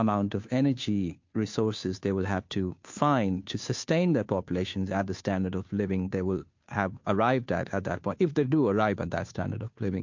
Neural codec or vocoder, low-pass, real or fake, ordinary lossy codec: codec, 16 kHz, 2 kbps, FunCodec, trained on Chinese and English, 25 frames a second; 7.2 kHz; fake; MP3, 48 kbps